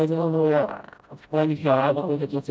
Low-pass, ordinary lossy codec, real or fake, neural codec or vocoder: none; none; fake; codec, 16 kHz, 0.5 kbps, FreqCodec, smaller model